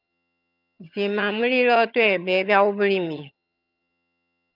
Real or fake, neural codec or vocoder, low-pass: fake; vocoder, 22.05 kHz, 80 mel bands, HiFi-GAN; 5.4 kHz